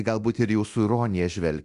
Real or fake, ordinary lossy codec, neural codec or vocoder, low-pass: fake; Opus, 64 kbps; codec, 24 kHz, 0.9 kbps, DualCodec; 10.8 kHz